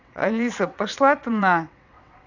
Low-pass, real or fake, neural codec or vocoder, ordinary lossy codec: 7.2 kHz; fake; vocoder, 22.05 kHz, 80 mel bands, WaveNeXt; none